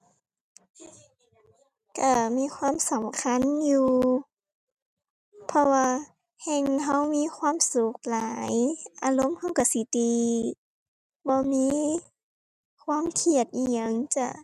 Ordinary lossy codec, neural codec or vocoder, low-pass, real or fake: none; none; 14.4 kHz; real